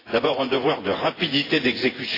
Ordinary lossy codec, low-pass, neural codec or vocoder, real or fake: AAC, 24 kbps; 5.4 kHz; vocoder, 24 kHz, 100 mel bands, Vocos; fake